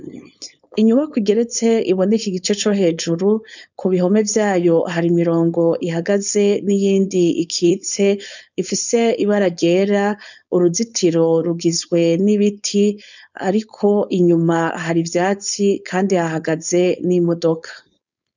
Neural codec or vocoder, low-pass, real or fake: codec, 16 kHz, 4.8 kbps, FACodec; 7.2 kHz; fake